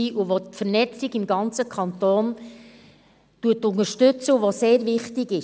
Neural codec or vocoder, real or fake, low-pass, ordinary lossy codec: none; real; none; none